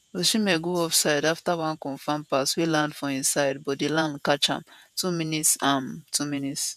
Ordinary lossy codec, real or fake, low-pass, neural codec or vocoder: none; fake; 14.4 kHz; vocoder, 48 kHz, 128 mel bands, Vocos